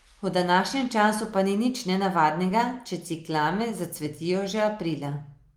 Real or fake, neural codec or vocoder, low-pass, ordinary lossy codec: real; none; 19.8 kHz; Opus, 32 kbps